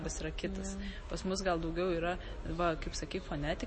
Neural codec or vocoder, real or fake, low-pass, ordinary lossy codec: none; real; 9.9 kHz; MP3, 32 kbps